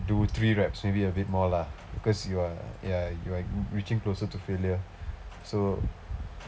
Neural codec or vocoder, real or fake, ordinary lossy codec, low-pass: none; real; none; none